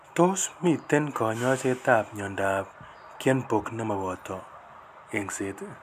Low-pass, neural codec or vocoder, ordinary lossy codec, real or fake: 14.4 kHz; none; none; real